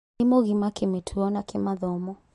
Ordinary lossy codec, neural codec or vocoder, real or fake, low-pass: MP3, 48 kbps; none; real; 10.8 kHz